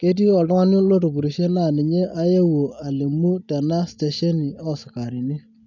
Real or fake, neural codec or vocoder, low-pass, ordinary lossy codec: real; none; 7.2 kHz; none